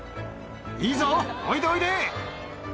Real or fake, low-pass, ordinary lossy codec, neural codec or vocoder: real; none; none; none